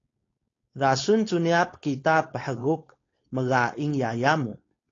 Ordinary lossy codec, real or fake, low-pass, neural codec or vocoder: AAC, 32 kbps; fake; 7.2 kHz; codec, 16 kHz, 4.8 kbps, FACodec